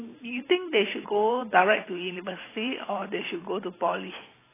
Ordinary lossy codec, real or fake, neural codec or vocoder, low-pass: AAC, 16 kbps; fake; vocoder, 44.1 kHz, 128 mel bands every 512 samples, BigVGAN v2; 3.6 kHz